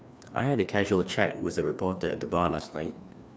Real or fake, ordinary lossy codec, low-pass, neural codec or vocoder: fake; none; none; codec, 16 kHz, 2 kbps, FreqCodec, larger model